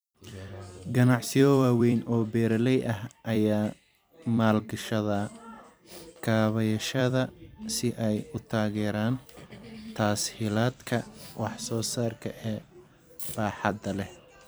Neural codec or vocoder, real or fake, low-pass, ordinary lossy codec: vocoder, 44.1 kHz, 128 mel bands every 256 samples, BigVGAN v2; fake; none; none